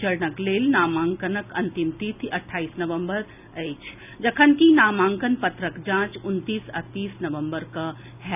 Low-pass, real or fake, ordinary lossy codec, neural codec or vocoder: 3.6 kHz; real; none; none